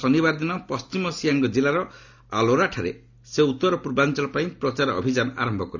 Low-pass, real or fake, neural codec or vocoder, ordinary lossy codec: 7.2 kHz; real; none; none